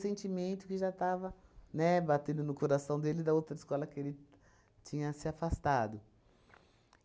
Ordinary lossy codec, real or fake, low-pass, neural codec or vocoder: none; real; none; none